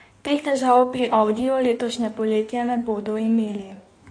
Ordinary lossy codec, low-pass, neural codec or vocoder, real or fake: AAC, 48 kbps; 9.9 kHz; codec, 16 kHz in and 24 kHz out, 1.1 kbps, FireRedTTS-2 codec; fake